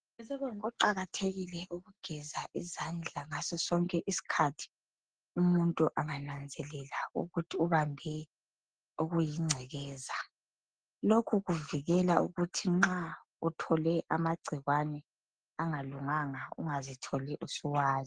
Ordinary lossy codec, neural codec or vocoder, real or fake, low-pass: Opus, 16 kbps; none; real; 9.9 kHz